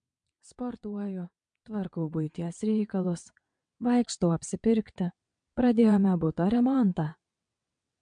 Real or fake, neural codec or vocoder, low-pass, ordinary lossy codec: fake; vocoder, 22.05 kHz, 80 mel bands, WaveNeXt; 9.9 kHz; MP3, 64 kbps